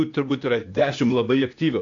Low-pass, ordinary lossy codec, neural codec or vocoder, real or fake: 7.2 kHz; AAC, 48 kbps; codec, 16 kHz, 0.8 kbps, ZipCodec; fake